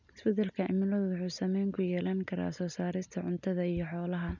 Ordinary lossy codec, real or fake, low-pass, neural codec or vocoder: none; real; 7.2 kHz; none